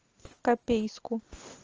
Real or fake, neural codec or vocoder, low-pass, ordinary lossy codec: real; none; 7.2 kHz; Opus, 24 kbps